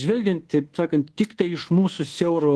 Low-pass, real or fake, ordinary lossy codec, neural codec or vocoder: 10.8 kHz; fake; Opus, 16 kbps; codec, 24 kHz, 1.2 kbps, DualCodec